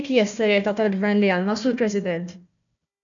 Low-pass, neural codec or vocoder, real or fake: 7.2 kHz; codec, 16 kHz, 1 kbps, FunCodec, trained on Chinese and English, 50 frames a second; fake